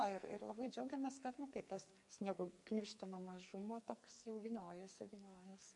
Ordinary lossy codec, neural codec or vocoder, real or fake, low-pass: MP3, 48 kbps; codec, 44.1 kHz, 2.6 kbps, SNAC; fake; 10.8 kHz